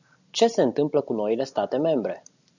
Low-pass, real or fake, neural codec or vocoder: 7.2 kHz; real; none